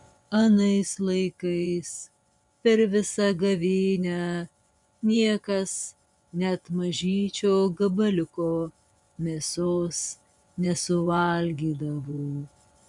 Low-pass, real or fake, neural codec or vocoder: 10.8 kHz; fake; vocoder, 24 kHz, 100 mel bands, Vocos